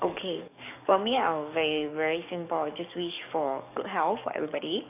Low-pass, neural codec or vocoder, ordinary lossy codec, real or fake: 3.6 kHz; codec, 44.1 kHz, 7.8 kbps, DAC; none; fake